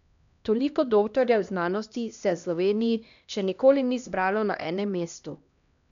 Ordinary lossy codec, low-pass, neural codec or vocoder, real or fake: none; 7.2 kHz; codec, 16 kHz, 1 kbps, X-Codec, HuBERT features, trained on LibriSpeech; fake